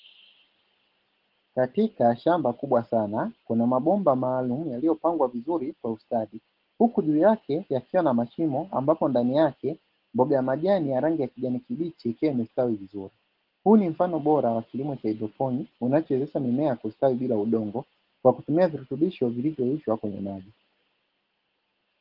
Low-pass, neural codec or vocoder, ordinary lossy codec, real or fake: 5.4 kHz; none; Opus, 16 kbps; real